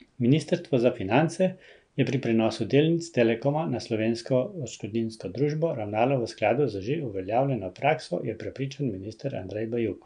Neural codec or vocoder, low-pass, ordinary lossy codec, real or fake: none; 9.9 kHz; none; real